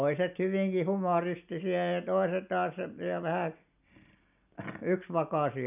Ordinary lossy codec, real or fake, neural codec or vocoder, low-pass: none; real; none; 3.6 kHz